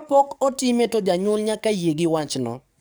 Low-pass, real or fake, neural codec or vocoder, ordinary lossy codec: none; fake; codec, 44.1 kHz, 7.8 kbps, DAC; none